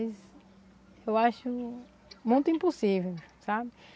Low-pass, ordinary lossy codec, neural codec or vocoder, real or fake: none; none; none; real